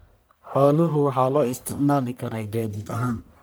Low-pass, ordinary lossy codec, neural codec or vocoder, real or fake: none; none; codec, 44.1 kHz, 1.7 kbps, Pupu-Codec; fake